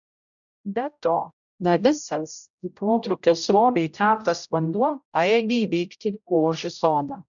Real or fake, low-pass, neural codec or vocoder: fake; 7.2 kHz; codec, 16 kHz, 0.5 kbps, X-Codec, HuBERT features, trained on general audio